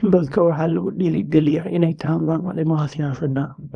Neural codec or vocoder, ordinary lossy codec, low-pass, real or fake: codec, 24 kHz, 0.9 kbps, WavTokenizer, small release; none; 9.9 kHz; fake